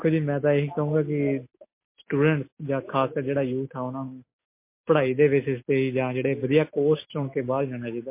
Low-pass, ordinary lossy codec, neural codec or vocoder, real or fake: 3.6 kHz; MP3, 24 kbps; none; real